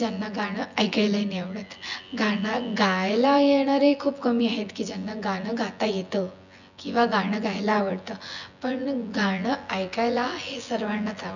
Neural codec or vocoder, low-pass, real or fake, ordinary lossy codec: vocoder, 24 kHz, 100 mel bands, Vocos; 7.2 kHz; fake; none